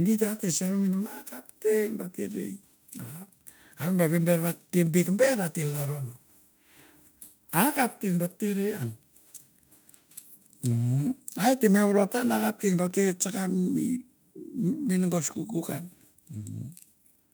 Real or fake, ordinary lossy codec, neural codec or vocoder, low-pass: fake; none; autoencoder, 48 kHz, 32 numbers a frame, DAC-VAE, trained on Japanese speech; none